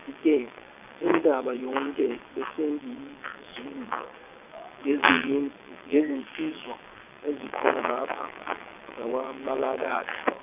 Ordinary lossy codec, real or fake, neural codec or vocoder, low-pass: none; fake; vocoder, 22.05 kHz, 80 mel bands, WaveNeXt; 3.6 kHz